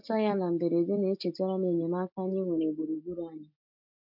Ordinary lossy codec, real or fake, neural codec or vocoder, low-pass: MP3, 48 kbps; real; none; 5.4 kHz